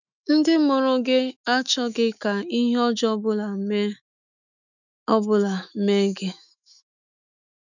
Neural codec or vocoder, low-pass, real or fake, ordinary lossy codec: autoencoder, 48 kHz, 128 numbers a frame, DAC-VAE, trained on Japanese speech; 7.2 kHz; fake; none